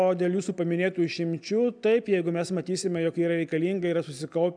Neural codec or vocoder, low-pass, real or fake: none; 9.9 kHz; real